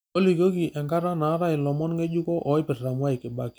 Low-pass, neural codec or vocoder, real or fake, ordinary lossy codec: none; none; real; none